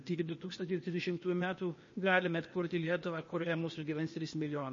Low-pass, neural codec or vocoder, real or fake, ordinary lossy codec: 7.2 kHz; codec, 16 kHz, 0.8 kbps, ZipCodec; fake; MP3, 32 kbps